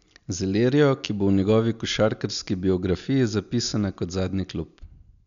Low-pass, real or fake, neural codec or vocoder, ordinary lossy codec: 7.2 kHz; real; none; none